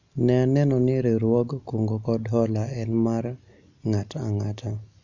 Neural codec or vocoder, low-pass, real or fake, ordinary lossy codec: none; 7.2 kHz; real; none